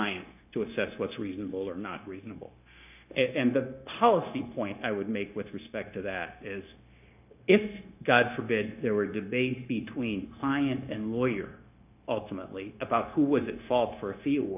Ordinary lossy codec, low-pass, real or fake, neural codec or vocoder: AAC, 32 kbps; 3.6 kHz; fake; codec, 16 kHz, 0.9 kbps, LongCat-Audio-Codec